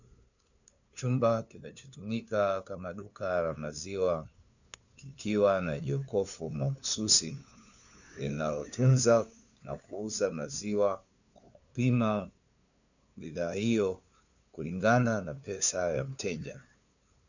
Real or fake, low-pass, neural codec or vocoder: fake; 7.2 kHz; codec, 16 kHz, 2 kbps, FunCodec, trained on LibriTTS, 25 frames a second